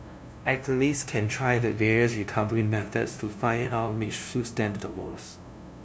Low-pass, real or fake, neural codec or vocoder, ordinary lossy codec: none; fake; codec, 16 kHz, 0.5 kbps, FunCodec, trained on LibriTTS, 25 frames a second; none